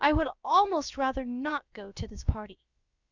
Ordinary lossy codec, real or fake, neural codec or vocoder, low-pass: Opus, 64 kbps; fake; codec, 16 kHz, about 1 kbps, DyCAST, with the encoder's durations; 7.2 kHz